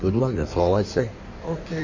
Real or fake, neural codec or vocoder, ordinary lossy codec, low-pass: fake; codec, 16 kHz in and 24 kHz out, 1.1 kbps, FireRedTTS-2 codec; MP3, 32 kbps; 7.2 kHz